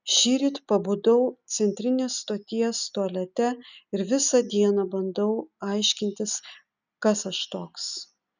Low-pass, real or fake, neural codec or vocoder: 7.2 kHz; real; none